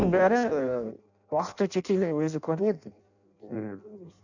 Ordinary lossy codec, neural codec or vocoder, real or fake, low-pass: none; codec, 16 kHz in and 24 kHz out, 0.6 kbps, FireRedTTS-2 codec; fake; 7.2 kHz